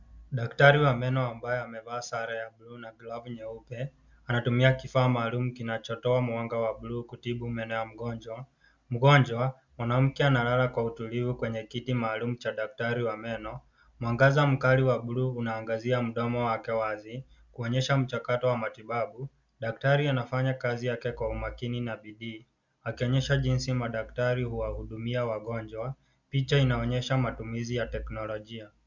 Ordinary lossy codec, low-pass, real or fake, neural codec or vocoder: Opus, 64 kbps; 7.2 kHz; real; none